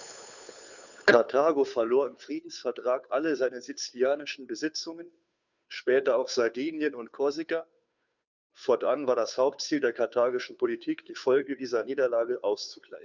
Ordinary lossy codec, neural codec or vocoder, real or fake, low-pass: none; codec, 16 kHz, 2 kbps, FunCodec, trained on Chinese and English, 25 frames a second; fake; 7.2 kHz